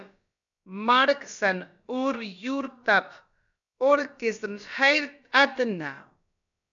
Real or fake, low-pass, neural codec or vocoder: fake; 7.2 kHz; codec, 16 kHz, about 1 kbps, DyCAST, with the encoder's durations